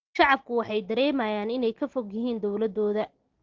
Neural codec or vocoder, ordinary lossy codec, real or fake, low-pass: none; Opus, 16 kbps; real; 7.2 kHz